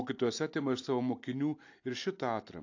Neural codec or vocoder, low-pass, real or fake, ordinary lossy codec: none; 7.2 kHz; real; AAC, 48 kbps